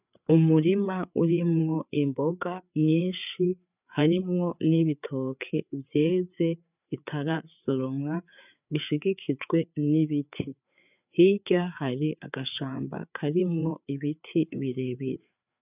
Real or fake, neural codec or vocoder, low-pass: fake; codec, 16 kHz, 4 kbps, FreqCodec, larger model; 3.6 kHz